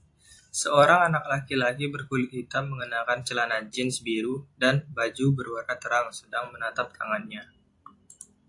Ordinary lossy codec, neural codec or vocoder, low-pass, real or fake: AAC, 64 kbps; vocoder, 44.1 kHz, 128 mel bands every 256 samples, BigVGAN v2; 10.8 kHz; fake